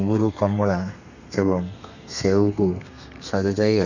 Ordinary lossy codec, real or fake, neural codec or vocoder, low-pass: none; fake; codec, 32 kHz, 1.9 kbps, SNAC; 7.2 kHz